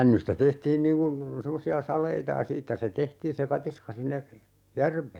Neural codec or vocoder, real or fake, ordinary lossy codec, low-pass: codec, 44.1 kHz, 7.8 kbps, DAC; fake; none; 19.8 kHz